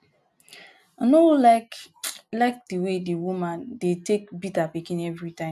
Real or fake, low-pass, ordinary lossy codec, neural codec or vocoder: real; 14.4 kHz; none; none